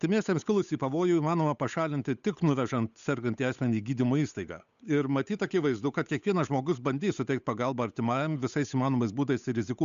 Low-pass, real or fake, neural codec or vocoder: 7.2 kHz; fake; codec, 16 kHz, 8 kbps, FunCodec, trained on Chinese and English, 25 frames a second